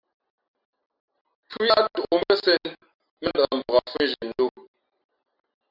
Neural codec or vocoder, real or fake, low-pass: none; real; 5.4 kHz